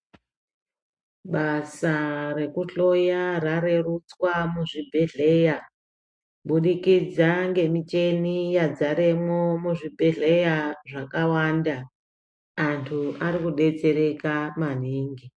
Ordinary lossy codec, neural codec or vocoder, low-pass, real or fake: MP3, 48 kbps; none; 9.9 kHz; real